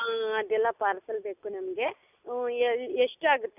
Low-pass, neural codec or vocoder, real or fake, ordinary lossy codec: 3.6 kHz; none; real; none